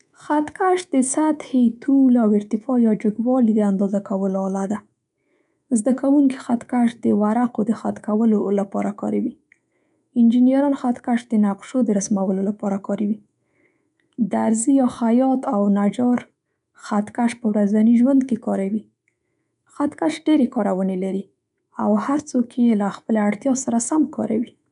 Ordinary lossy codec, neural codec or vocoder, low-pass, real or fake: none; codec, 24 kHz, 3.1 kbps, DualCodec; 10.8 kHz; fake